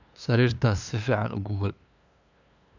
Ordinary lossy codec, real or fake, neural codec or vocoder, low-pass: none; fake; codec, 16 kHz, 2 kbps, FunCodec, trained on LibriTTS, 25 frames a second; 7.2 kHz